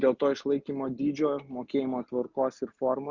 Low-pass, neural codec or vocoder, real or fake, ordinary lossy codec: 7.2 kHz; none; real; Opus, 64 kbps